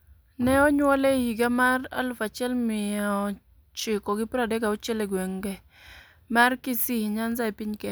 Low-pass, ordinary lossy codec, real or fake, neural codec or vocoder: none; none; real; none